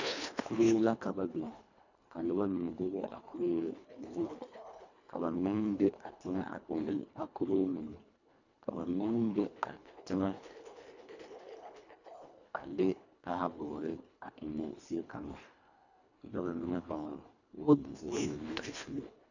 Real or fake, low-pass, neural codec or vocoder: fake; 7.2 kHz; codec, 24 kHz, 1.5 kbps, HILCodec